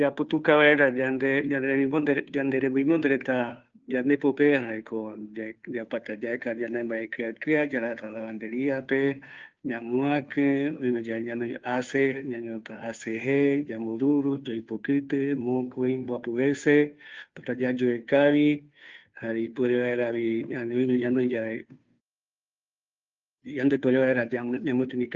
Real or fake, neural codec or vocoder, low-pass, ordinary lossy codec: fake; codec, 16 kHz, 2 kbps, FunCodec, trained on Chinese and English, 25 frames a second; 7.2 kHz; Opus, 24 kbps